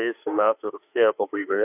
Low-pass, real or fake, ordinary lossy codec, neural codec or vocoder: 3.6 kHz; fake; AAC, 32 kbps; autoencoder, 48 kHz, 32 numbers a frame, DAC-VAE, trained on Japanese speech